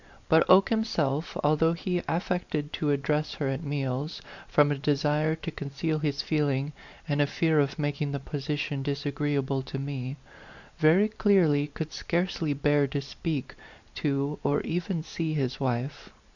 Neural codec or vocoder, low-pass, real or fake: vocoder, 44.1 kHz, 128 mel bands every 512 samples, BigVGAN v2; 7.2 kHz; fake